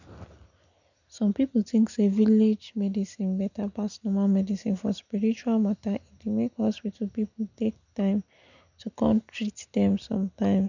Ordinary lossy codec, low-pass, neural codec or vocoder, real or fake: none; 7.2 kHz; none; real